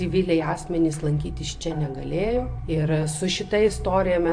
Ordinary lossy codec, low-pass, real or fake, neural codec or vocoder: AAC, 64 kbps; 9.9 kHz; fake; vocoder, 44.1 kHz, 128 mel bands every 512 samples, BigVGAN v2